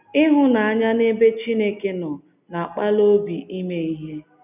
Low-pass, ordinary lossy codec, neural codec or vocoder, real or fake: 3.6 kHz; none; none; real